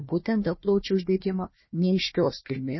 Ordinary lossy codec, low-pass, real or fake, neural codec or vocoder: MP3, 24 kbps; 7.2 kHz; fake; codec, 16 kHz, 1 kbps, FunCodec, trained on Chinese and English, 50 frames a second